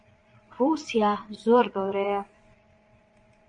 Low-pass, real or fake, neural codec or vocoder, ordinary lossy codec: 9.9 kHz; fake; vocoder, 22.05 kHz, 80 mel bands, Vocos; AAC, 64 kbps